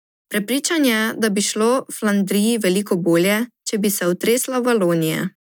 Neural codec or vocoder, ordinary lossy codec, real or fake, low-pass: none; none; real; none